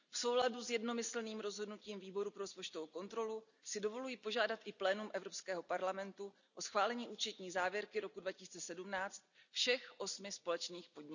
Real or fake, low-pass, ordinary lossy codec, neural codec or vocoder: real; 7.2 kHz; none; none